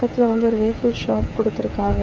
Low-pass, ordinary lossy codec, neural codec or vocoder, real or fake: none; none; codec, 16 kHz, 8 kbps, FreqCodec, smaller model; fake